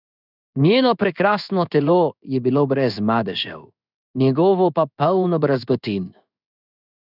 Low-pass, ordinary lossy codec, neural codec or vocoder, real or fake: 5.4 kHz; none; codec, 16 kHz in and 24 kHz out, 1 kbps, XY-Tokenizer; fake